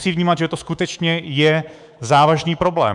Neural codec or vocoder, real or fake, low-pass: codec, 24 kHz, 3.1 kbps, DualCodec; fake; 10.8 kHz